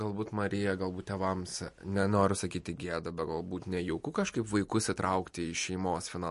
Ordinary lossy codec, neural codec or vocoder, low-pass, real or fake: MP3, 48 kbps; none; 14.4 kHz; real